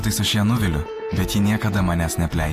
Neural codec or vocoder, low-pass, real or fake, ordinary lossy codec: vocoder, 48 kHz, 128 mel bands, Vocos; 14.4 kHz; fake; AAC, 64 kbps